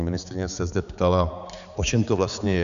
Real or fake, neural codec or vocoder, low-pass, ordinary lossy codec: fake; codec, 16 kHz, 4 kbps, X-Codec, HuBERT features, trained on balanced general audio; 7.2 kHz; MP3, 96 kbps